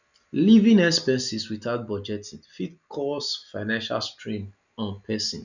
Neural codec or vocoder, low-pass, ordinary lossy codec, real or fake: none; 7.2 kHz; none; real